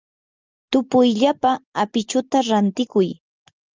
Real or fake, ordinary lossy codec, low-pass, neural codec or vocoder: real; Opus, 24 kbps; 7.2 kHz; none